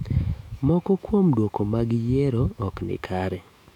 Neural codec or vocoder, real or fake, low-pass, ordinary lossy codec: autoencoder, 48 kHz, 128 numbers a frame, DAC-VAE, trained on Japanese speech; fake; 19.8 kHz; none